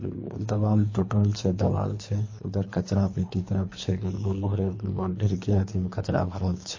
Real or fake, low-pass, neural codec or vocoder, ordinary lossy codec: fake; 7.2 kHz; codec, 24 kHz, 3 kbps, HILCodec; MP3, 32 kbps